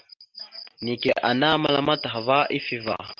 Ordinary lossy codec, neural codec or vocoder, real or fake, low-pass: Opus, 24 kbps; none; real; 7.2 kHz